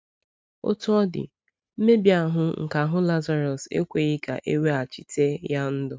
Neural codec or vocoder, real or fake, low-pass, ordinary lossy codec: none; real; none; none